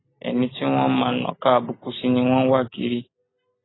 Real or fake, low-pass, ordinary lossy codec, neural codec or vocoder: real; 7.2 kHz; AAC, 16 kbps; none